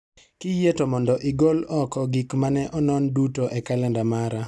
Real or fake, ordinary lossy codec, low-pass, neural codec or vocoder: real; none; none; none